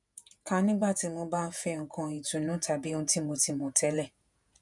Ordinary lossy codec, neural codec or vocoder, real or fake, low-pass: none; vocoder, 48 kHz, 128 mel bands, Vocos; fake; 10.8 kHz